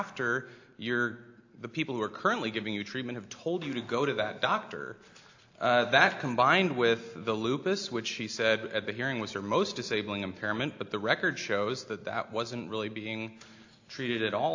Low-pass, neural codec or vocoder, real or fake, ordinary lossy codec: 7.2 kHz; none; real; AAC, 48 kbps